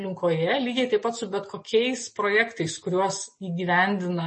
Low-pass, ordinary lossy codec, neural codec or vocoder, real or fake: 9.9 kHz; MP3, 32 kbps; none; real